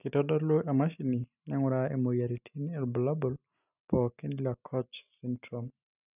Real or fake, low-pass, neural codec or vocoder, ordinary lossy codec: real; 3.6 kHz; none; none